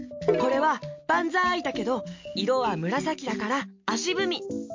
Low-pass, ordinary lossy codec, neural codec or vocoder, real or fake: 7.2 kHz; MP3, 48 kbps; none; real